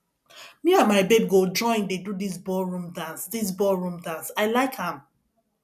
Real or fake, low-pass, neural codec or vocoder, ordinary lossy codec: real; 14.4 kHz; none; none